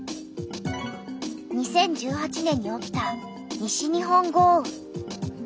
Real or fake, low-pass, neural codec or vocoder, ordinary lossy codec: real; none; none; none